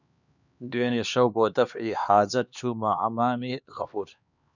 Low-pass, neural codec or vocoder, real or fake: 7.2 kHz; codec, 16 kHz, 2 kbps, X-Codec, HuBERT features, trained on LibriSpeech; fake